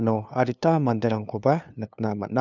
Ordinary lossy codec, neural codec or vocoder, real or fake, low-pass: none; codec, 16 kHz, 2 kbps, FunCodec, trained on LibriTTS, 25 frames a second; fake; 7.2 kHz